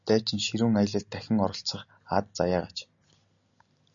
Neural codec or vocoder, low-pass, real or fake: none; 7.2 kHz; real